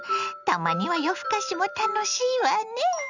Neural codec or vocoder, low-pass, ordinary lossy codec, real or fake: none; 7.2 kHz; none; real